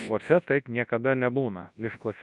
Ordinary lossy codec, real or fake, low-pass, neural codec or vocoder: MP3, 64 kbps; fake; 10.8 kHz; codec, 24 kHz, 0.9 kbps, WavTokenizer, large speech release